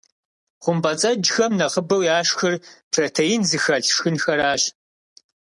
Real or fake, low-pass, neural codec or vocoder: real; 10.8 kHz; none